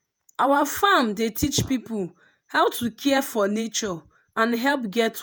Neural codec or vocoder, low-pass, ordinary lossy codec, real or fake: vocoder, 48 kHz, 128 mel bands, Vocos; none; none; fake